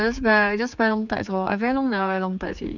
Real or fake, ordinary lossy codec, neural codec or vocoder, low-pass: fake; none; codec, 16 kHz, 4 kbps, X-Codec, HuBERT features, trained on general audio; 7.2 kHz